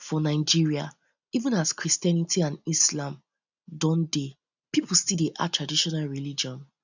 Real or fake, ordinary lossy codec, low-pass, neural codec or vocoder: real; none; 7.2 kHz; none